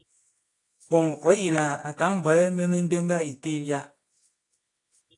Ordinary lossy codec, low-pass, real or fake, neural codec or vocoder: AAC, 48 kbps; 10.8 kHz; fake; codec, 24 kHz, 0.9 kbps, WavTokenizer, medium music audio release